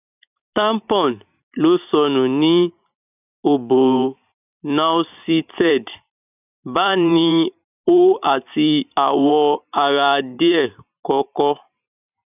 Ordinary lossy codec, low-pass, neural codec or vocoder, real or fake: none; 3.6 kHz; vocoder, 44.1 kHz, 128 mel bands every 512 samples, BigVGAN v2; fake